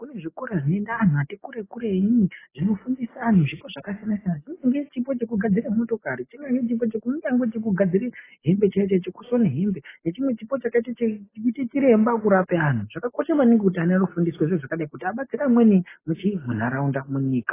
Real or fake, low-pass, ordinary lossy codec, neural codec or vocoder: real; 3.6 kHz; AAC, 16 kbps; none